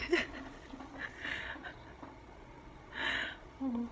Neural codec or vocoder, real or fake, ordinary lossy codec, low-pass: codec, 16 kHz, 16 kbps, FreqCodec, larger model; fake; none; none